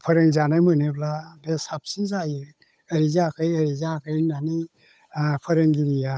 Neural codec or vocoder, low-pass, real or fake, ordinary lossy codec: codec, 16 kHz, 8 kbps, FunCodec, trained on Chinese and English, 25 frames a second; none; fake; none